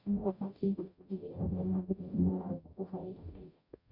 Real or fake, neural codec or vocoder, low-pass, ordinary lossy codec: fake; codec, 44.1 kHz, 0.9 kbps, DAC; 5.4 kHz; none